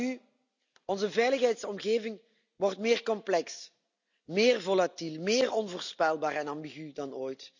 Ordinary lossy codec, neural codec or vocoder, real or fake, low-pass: none; none; real; 7.2 kHz